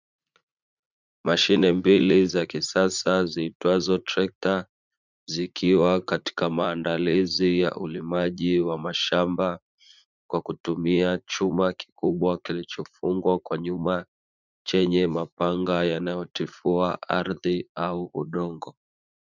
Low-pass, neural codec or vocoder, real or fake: 7.2 kHz; vocoder, 44.1 kHz, 80 mel bands, Vocos; fake